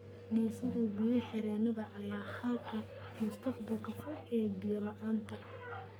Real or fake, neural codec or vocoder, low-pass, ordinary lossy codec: fake; codec, 44.1 kHz, 3.4 kbps, Pupu-Codec; none; none